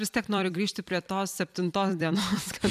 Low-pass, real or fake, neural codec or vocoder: 14.4 kHz; fake; vocoder, 44.1 kHz, 128 mel bands every 256 samples, BigVGAN v2